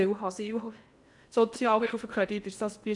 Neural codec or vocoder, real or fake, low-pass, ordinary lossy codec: codec, 16 kHz in and 24 kHz out, 0.8 kbps, FocalCodec, streaming, 65536 codes; fake; 10.8 kHz; none